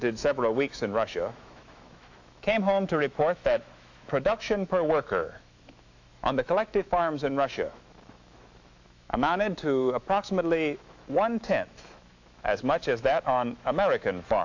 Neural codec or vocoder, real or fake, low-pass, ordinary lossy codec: autoencoder, 48 kHz, 128 numbers a frame, DAC-VAE, trained on Japanese speech; fake; 7.2 kHz; AAC, 48 kbps